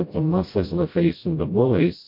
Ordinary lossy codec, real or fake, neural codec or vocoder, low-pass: MP3, 32 kbps; fake; codec, 16 kHz, 0.5 kbps, FreqCodec, smaller model; 5.4 kHz